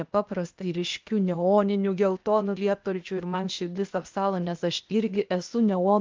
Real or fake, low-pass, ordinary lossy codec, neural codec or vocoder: fake; 7.2 kHz; Opus, 24 kbps; codec, 16 kHz, 0.8 kbps, ZipCodec